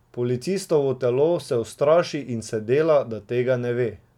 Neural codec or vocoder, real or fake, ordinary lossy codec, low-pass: none; real; none; 19.8 kHz